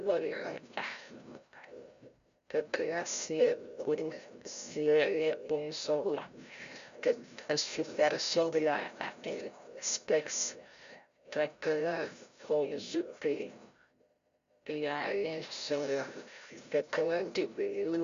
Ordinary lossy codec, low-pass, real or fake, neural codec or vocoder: Opus, 64 kbps; 7.2 kHz; fake; codec, 16 kHz, 0.5 kbps, FreqCodec, larger model